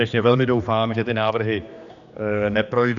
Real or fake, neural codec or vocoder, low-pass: fake; codec, 16 kHz, 2 kbps, X-Codec, HuBERT features, trained on general audio; 7.2 kHz